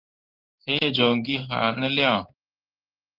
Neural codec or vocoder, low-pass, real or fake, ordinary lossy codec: codec, 16 kHz in and 24 kHz out, 1 kbps, XY-Tokenizer; 5.4 kHz; fake; Opus, 32 kbps